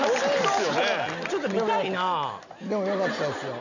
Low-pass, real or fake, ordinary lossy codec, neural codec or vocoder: 7.2 kHz; real; none; none